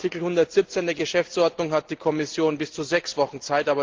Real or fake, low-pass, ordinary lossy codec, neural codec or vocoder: real; 7.2 kHz; Opus, 16 kbps; none